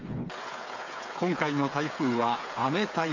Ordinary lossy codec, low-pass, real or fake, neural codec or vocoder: MP3, 48 kbps; 7.2 kHz; fake; codec, 16 kHz, 8 kbps, FreqCodec, smaller model